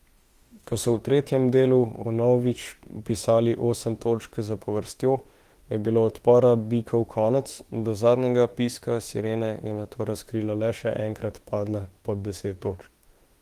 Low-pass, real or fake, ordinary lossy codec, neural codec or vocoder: 14.4 kHz; fake; Opus, 24 kbps; autoencoder, 48 kHz, 32 numbers a frame, DAC-VAE, trained on Japanese speech